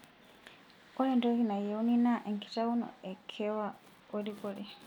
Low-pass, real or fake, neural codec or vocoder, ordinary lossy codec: 19.8 kHz; real; none; none